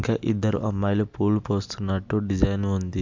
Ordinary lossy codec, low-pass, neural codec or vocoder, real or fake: MP3, 64 kbps; 7.2 kHz; none; real